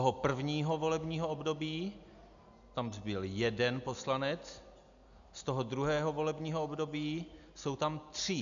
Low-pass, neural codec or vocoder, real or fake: 7.2 kHz; none; real